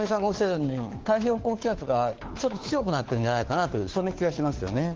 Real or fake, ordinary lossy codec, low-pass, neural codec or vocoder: fake; Opus, 16 kbps; 7.2 kHz; codec, 16 kHz, 4 kbps, FunCodec, trained on LibriTTS, 50 frames a second